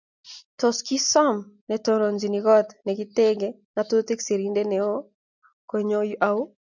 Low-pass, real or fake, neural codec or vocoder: 7.2 kHz; real; none